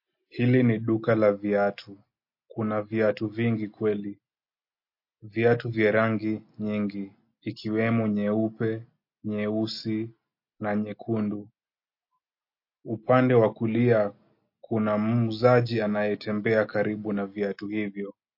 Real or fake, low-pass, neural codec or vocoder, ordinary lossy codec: real; 5.4 kHz; none; MP3, 32 kbps